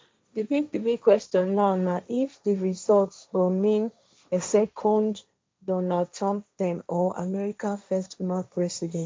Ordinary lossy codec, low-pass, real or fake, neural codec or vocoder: none; none; fake; codec, 16 kHz, 1.1 kbps, Voila-Tokenizer